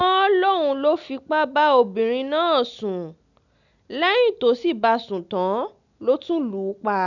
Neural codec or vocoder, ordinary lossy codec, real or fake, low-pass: none; none; real; 7.2 kHz